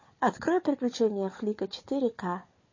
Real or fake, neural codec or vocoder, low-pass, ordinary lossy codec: fake; codec, 16 kHz, 4 kbps, FunCodec, trained on Chinese and English, 50 frames a second; 7.2 kHz; MP3, 32 kbps